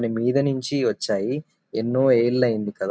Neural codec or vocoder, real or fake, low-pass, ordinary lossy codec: none; real; none; none